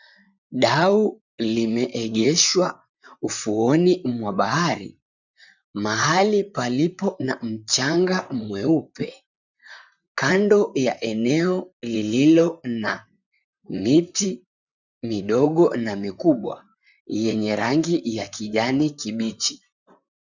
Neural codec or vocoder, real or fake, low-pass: vocoder, 44.1 kHz, 128 mel bands, Pupu-Vocoder; fake; 7.2 kHz